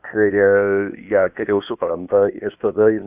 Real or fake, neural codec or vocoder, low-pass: fake; codec, 16 kHz, 0.8 kbps, ZipCodec; 3.6 kHz